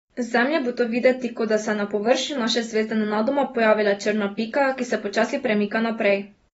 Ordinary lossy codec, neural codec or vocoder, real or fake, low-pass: AAC, 24 kbps; none; real; 14.4 kHz